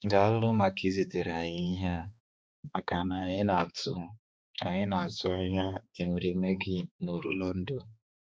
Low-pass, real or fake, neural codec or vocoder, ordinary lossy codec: none; fake; codec, 16 kHz, 2 kbps, X-Codec, HuBERT features, trained on balanced general audio; none